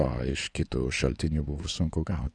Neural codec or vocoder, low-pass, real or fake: codec, 16 kHz in and 24 kHz out, 2.2 kbps, FireRedTTS-2 codec; 9.9 kHz; fake